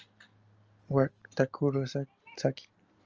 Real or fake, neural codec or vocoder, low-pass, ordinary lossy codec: real; none; 7.2 kHz; Opus, 24 kbps